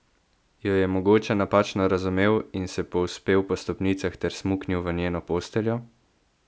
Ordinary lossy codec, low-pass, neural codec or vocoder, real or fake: none; none; none; real